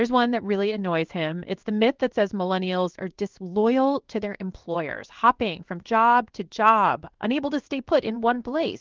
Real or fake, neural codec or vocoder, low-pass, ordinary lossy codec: fake; codec, 16 kHz in and 24 kHz out, 1 kbps, XY-Tokenizer; 7.2 kHz; Opus, 24 kbps